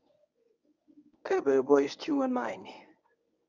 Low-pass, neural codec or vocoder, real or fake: 7.2 kHz; codec, 24 kHz, 0.9 kbps, WavTokenizer, medium speech release version 1; fake